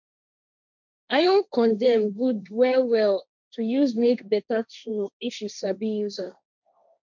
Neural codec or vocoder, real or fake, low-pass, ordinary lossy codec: codec, 16 kHz, 1.1 kbps, Voila-Tokenizer; fake; 7.2 kHz; none